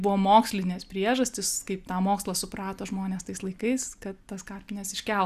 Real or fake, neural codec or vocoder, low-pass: real; none; 14.4 kHz